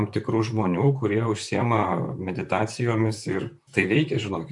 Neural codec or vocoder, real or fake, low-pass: vocoder, 44.1 kHz, 128 mel bands, Pupu-Vocoder; fake; 10.8 kHz